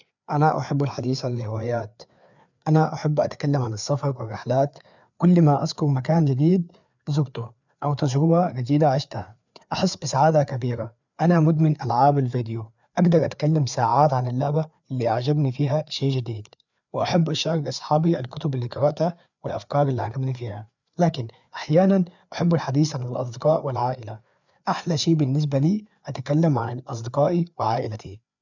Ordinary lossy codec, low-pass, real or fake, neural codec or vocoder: none; 7.2 kHz; fake; codec, 16 kHz, 4 kbps, FreqCodec, larger model